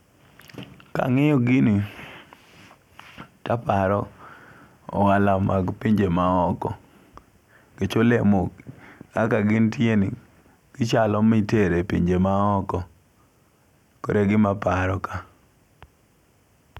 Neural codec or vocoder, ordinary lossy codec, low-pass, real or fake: none; none; 19.8 kHz; real